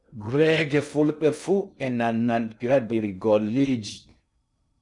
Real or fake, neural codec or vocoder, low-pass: fake; codec, 16 kHz in and 24 kHz out, 0.6 kbps, FocalCodec, streaming, 2048 codes; 10.8 kHz